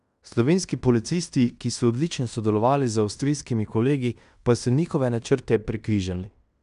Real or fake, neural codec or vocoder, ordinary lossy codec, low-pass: fake; codec, 16 kHz in and 24 kHz out, 0.9 kbps, LongCat-Audio-Codec, fine tuned four codebook decoder; none; 10.8 kHz